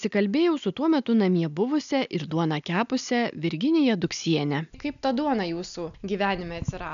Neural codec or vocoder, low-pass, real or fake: none; 7.2 kHz; real